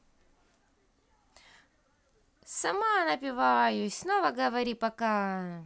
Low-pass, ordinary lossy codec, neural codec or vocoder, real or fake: none; none; none; real